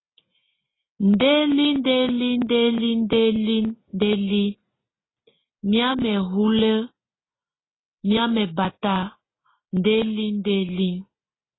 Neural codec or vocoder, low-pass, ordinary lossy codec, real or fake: none; 7.2 kHz; AAC, 16 kbps; real